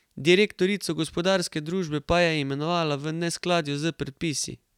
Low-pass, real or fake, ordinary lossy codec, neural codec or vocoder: 19.8 kHz; real; none; none